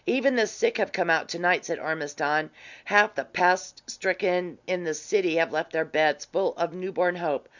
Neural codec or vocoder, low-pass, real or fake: none; 7.2 kHz; real